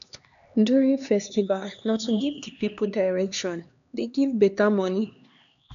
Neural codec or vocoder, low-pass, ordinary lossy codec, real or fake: codec, 16 kHz, 2 kbps, X-Codec, HuBERT features, trained on LibriSpeech; 7.2 kHz; none; fake